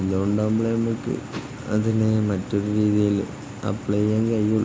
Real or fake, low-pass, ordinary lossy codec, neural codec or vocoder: real; none; none; none